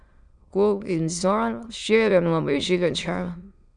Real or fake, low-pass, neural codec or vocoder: fake; 9.9 kHz; autoencoder, 22.05 kHz, a latent of 192 numbers a frame, VITS, trained on many speakers